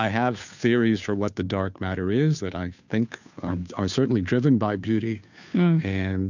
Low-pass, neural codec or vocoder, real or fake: 7.2 kHz; codec, 16 kHz, 2 kbps, FunCodec, trained on Chinese and English, 25 frames a second; fake